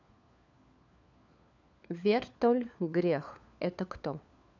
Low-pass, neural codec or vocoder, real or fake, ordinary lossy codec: 7.2 kHz; codec, 16 kHz, 4 kbps, FunCodec, trained on LibriTTS, 50 frames a second; fake; none